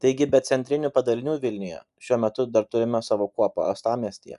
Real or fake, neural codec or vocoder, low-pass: real; none; 10.8 kHz